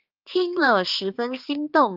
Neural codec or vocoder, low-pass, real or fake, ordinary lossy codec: codec, 16 kHz, 4 kbps, X-Codec, HuBERT features, trained on balanced general audio; 5.4 kHz; fake; Opus, 24 kbps